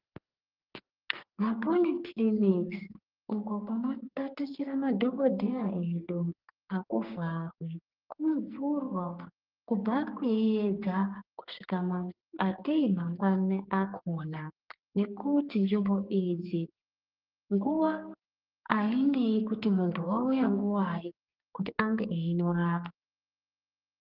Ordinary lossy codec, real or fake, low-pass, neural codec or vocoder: Opus, 32 kbps; fake; 5.4 kHz; codec, 44.1 kHz, 2.6 kbps, SNAC